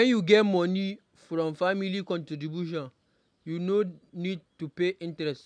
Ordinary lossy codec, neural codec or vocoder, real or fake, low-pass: none; none; real; 9.9 kHz